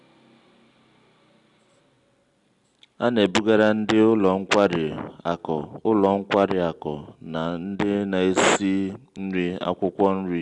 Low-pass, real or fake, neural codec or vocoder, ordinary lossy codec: 10.8 kHz; real; none; Opus, 64 kbps